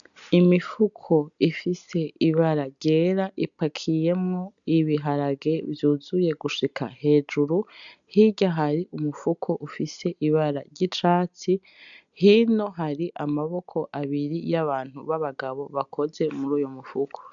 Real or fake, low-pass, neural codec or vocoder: real; 7.2 kHz; none